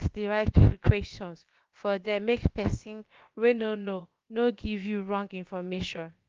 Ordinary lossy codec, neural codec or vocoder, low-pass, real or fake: Opus, 32 kbps; codec, 16 kHz, about 1 kbps, DyCAST, with the encoder's durations; 7.2 kHz; fake